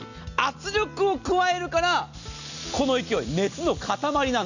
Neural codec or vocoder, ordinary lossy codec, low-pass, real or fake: none; none; 7.2 kHz; real